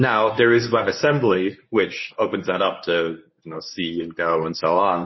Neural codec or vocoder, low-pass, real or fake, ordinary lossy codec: codec, 24 kHz, 0.9 kbps, WavTokenizer, medium speech release version 1; 7.2 kHz; fake; MP3, 24 kbps